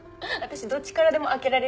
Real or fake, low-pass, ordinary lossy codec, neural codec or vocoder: real; none; none; none